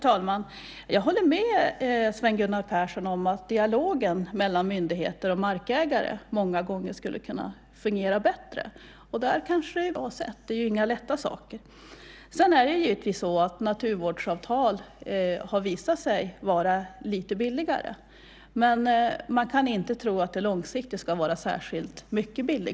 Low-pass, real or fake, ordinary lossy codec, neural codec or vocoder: none; real; none; none